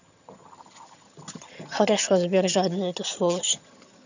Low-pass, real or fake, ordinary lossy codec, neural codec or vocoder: 7.2 kHz; fake; none; vocoder, 22.05 kHz, 80 mel bands, HiFi-GAN